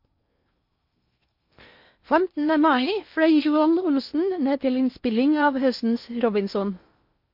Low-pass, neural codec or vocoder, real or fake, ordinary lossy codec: 5.4 kHz; codec, 16 kHz in and 24 kHz out, 0.8 kbps, FocalCodec, streaming, 65536 codes; fake; MP3, 32 kbps